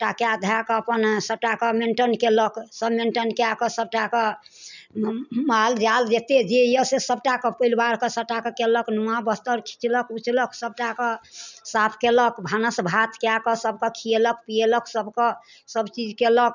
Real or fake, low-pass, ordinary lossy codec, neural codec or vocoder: real; 7.2 kHz; none; none